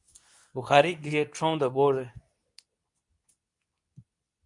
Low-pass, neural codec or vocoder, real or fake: 10.8 kHz; codec, 24 kHz, 0.9 kbps, WavTokenizer, medium speech release version 2; fake